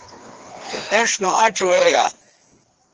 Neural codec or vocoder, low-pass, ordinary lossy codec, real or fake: codec, 16 kHz, 2 kbps, FreqCodec, larger model; 7.2 kHz; Opus, 16 kbps; fake